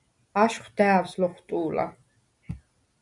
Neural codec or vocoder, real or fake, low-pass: none; real; 10.8 kHz